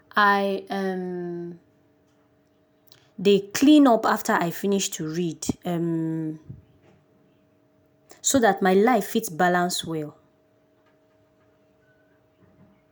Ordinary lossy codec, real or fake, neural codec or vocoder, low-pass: none; real; none; none